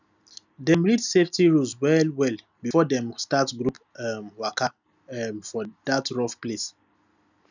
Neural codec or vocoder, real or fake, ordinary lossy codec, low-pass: none; real; none; 7.2 kHz